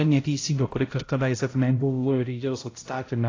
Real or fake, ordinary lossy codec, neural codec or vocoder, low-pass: fake; AAC, 32 kbps; codec, 16 kHz, 0.5 kbps, X-Codec, HuBERT features, trained on balanced general audio; 7.2 kHz